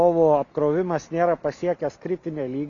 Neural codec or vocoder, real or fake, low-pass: none; real; 7.2 kHz